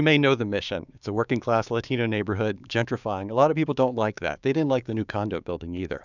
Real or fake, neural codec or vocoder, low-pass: fake; codec, 16 kHz, 6 kbps, DAC; 7.2 kHz